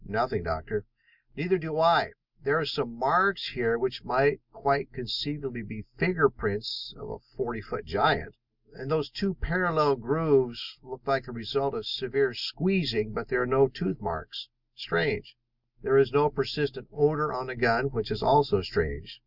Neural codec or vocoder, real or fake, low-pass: none; real; 5.4 kHz